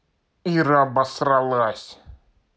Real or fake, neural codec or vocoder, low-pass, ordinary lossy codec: real; none; none; none